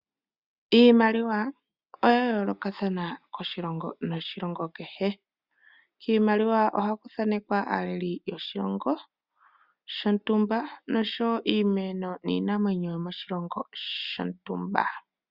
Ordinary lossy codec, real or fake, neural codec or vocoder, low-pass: Opus, 64 kbps; real; none; 5.4 kHz